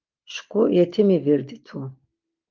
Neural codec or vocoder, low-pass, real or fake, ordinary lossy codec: none; 7.2 kHz; real; Opus, 32 kbps